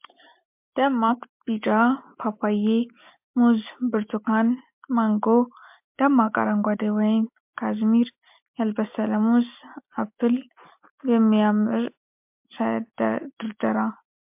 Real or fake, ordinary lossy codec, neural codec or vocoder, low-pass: real; MP3, 32 kbps; none; 3.6 kHz